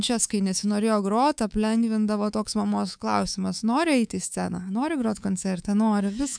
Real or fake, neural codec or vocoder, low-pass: fake; codec, 24 kHz, 3.1 kbps, DualCodec; 9.9 kHz